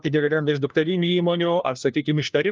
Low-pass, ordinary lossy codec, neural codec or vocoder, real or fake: 7.2 kHz; Opus, 24 kbps; codec, 16 kHz, 1 kbps, FunCodec, trained on LibriTTS, 50 frames a second; fake